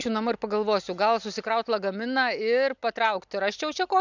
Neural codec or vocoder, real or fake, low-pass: none; real; 7.2 kHz